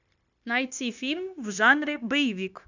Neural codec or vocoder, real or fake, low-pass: codec, 16 kHz, 0.9 kbps, LongCat-Audio-Codec; fake; 7.2 kHz